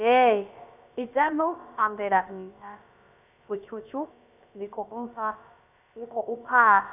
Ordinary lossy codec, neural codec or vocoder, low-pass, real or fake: none; codec, 16 kHz, about 1 kbps, DyCAST, with the encoder's durations; 3.6 kHz; fake